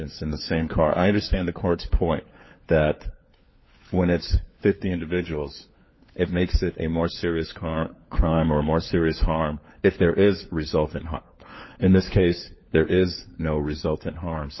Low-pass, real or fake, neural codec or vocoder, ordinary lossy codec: 7.2 kHz; fake; codec, 16 kHz, 4 kbps, FunCodec, trained on LibriTTS, 50 frames a second; MP3, 24 kbps